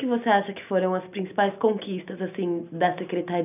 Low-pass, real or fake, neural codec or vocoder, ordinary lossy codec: 3.6 kHz; real; none; none